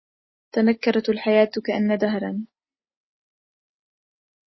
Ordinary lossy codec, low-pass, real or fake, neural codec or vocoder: MP3, 24 kbps; 7.2 kHz; real; none